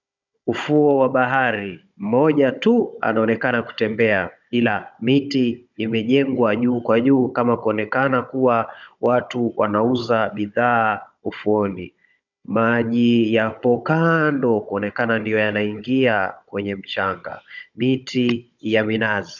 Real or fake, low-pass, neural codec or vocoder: fake; 7.2 kHz; codec, 16 kHz, 16 kbps, FunCodec, trained on Chinese and English, 50 frames a second